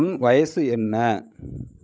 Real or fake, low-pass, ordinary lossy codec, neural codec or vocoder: fake; none; none; codec, 16 kHz, 16 kbps, FreqCodec, larger model